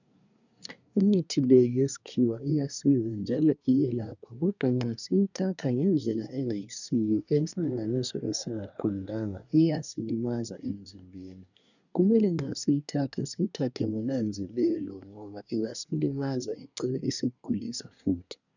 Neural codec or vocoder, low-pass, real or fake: codec, 24 kHz, 1 kbps, SNAC; 7.2 kHz; fake